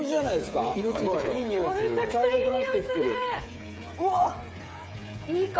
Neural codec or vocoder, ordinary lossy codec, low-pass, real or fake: codec, 16 kHz, 8 kbps, FreqCodec, smaller model; none; none; fake